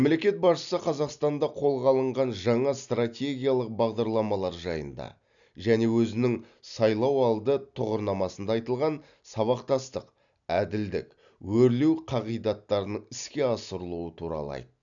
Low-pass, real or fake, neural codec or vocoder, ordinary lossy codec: 7.2 kHz; real; none; none